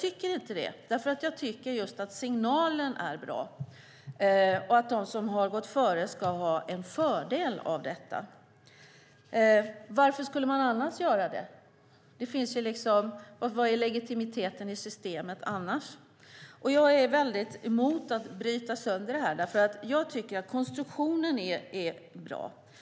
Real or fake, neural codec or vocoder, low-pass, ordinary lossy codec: real; none; none; none